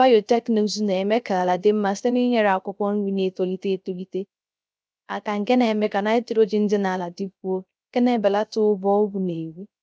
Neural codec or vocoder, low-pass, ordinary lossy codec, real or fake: codec, 16 kHz, 0.3 kbps, FocalCodec; none; none; fake